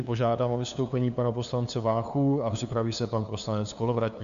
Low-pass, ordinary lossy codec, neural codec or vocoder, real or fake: 7.2 kHz; AAC, 96 kbps; codec, 16 kHz, 2 kbps, FunCodec, trained on LibriTTS, 25 frames a second; fake